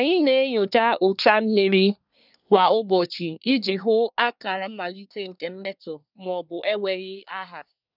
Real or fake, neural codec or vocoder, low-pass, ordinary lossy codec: fake; codec, 24 kHz, 1 kbps, SNAC; 5.4 kHz; none